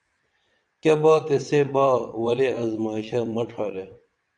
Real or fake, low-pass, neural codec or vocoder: fake; 9.9 kHz; vocoder, 22.05 kHz, 80 mel bands, WaveNeXt